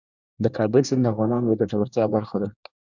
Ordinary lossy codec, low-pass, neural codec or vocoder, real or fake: Opus, 64 kbps; 7.2 kHz; codec, 24 kHz, 1 kbps, SNAC; fake